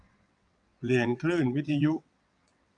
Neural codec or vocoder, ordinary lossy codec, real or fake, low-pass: vocoder, 22.05 kHz, 80 mel bands, WaveNeXt; none; fake; 9.9 kHz